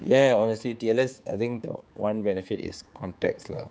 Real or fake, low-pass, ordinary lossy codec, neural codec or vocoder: fake; none; none; codec, 16 kHz, 4 kbps, X-Codec, HuBERT features, trained on general audio